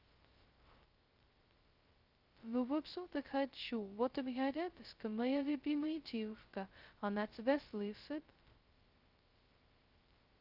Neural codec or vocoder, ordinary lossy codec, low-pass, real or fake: codec, 16 kHz, 0.2 kbps, FocalCodec; Opus, 24 kbps; 5.4 kHz; fake